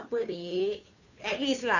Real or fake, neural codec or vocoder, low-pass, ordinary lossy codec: fake; codec, 16 kHz, 2 kbps, FunCodec, trained on Chinese and English, 25 frames a second; 7.2 kHz; none